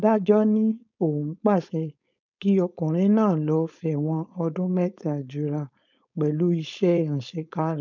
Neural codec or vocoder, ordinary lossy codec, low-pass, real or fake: codec, 16 kHz, 4.8 kbps, FACodec; none; 7.2 kHz; fake